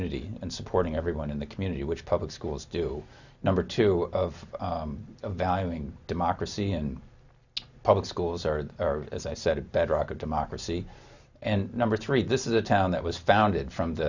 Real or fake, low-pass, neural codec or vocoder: real; 7.2 kHz; none